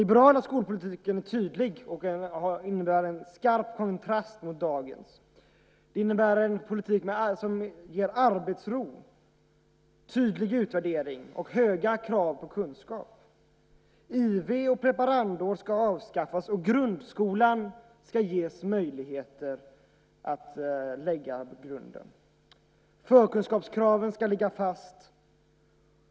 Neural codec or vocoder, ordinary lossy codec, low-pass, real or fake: none; none; none; real